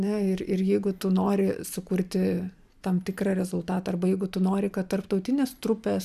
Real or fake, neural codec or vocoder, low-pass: fake; vocoder, 44.1 kHz, 128 mel bands every 512 samples, BigVGAN v2; 14.4 kHz